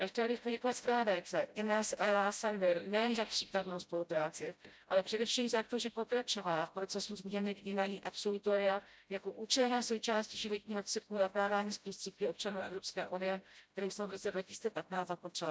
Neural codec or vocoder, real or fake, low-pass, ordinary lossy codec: codec, 16 kHz, 0.5 kbps, FreqCodec, smaller model; fake; none; none